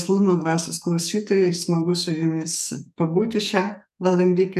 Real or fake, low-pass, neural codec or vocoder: fake; 14.4 kHz; codec, 44.1 kHz, 2.6 kbps, SNAC